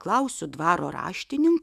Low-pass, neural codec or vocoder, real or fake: 14.4 kHz; autoencoder, 48 kHz, 128 numbers a frame, DAC-VAE, trained on Japanese speech; fake